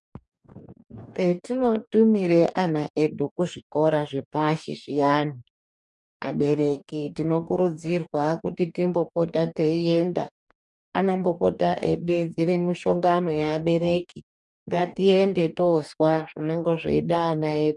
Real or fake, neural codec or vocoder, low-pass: fake; codec, 44.1 kHz, 2.6 kbps, DAC; 10.8 kHz